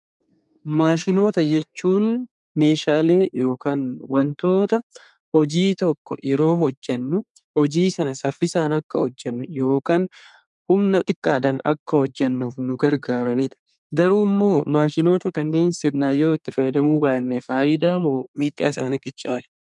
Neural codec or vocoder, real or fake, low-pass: codec, 24 kHz, 1 kbps, SNAC; fake; 10.8 kHz